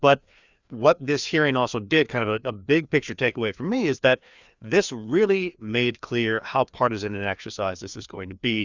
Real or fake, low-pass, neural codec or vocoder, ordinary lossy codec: fake; 7.2 kHz; codec, 16 kHz, 2 kbps, FreqCodec, larger model; Opus, 64 kbps